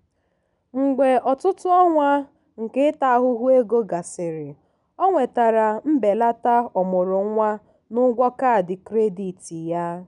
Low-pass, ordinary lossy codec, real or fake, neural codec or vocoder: 10.8 kHz; none; real; none